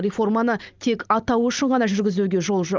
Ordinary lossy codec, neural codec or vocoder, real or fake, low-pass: Opus, 24 kbps; none; real; 7.2 kHz